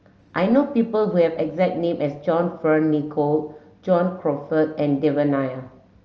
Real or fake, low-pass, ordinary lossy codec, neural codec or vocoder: real; 7.2 kHz; Opus, 24 kbps; none